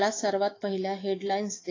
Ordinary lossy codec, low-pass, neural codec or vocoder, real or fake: AAC, 32 kbps; 7.2 kHz; autoencoder, 48 kHz, 128 numbers a frame, DAC-VAE, trained on Japanese speech; fake